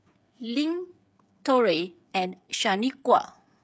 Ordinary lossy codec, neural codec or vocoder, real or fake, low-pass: none; codec, 16 kHz, 8 kbps, FreqCodec, smaller model; fake; none